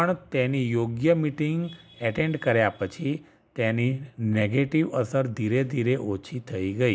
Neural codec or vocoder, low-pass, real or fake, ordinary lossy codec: none; none; real; none